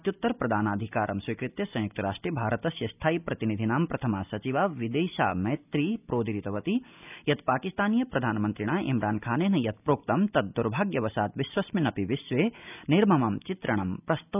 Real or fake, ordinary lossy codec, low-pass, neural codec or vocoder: real; none; 3.6 kHz; none